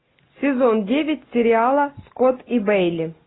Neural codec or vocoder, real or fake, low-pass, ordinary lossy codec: none; real; 7.2 kHz; AAC, 16 kbps